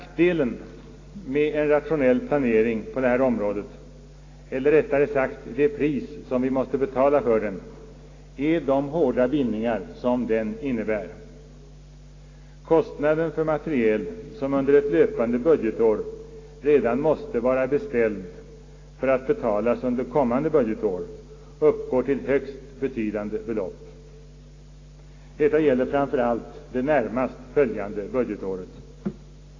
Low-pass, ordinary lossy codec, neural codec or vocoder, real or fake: 7.2 kHz; AAC, 32 kbps; none; real